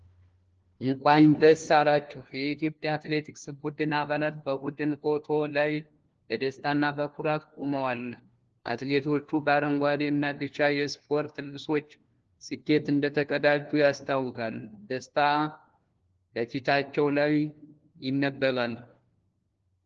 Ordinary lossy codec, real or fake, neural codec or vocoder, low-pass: Opus, 16 kbps; fake; codec, 16 kHz, 1 kbps, FunCodec, trained on LibriTTS, 50 frames a second; 7.2 kHz